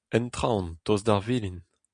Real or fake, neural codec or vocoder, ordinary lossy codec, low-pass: real; none; MP3, 96 kbps; 9.9 kHz